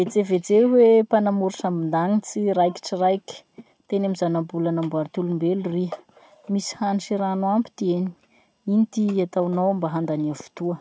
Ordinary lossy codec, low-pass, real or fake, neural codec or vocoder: none; none; real; none